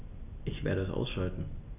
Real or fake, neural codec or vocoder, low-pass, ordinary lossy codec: real; none; 3.6 kHz; none